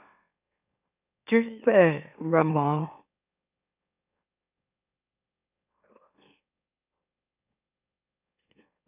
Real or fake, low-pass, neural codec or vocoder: fake; 3.6 kHz; autoencoder, 44.1 kHz, a latent of 192 numbers a frame, MeloTTS